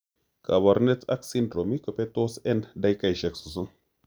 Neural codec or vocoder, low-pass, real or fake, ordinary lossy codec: none; none; real; none